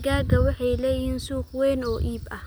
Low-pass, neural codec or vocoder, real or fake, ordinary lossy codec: none; none; real; none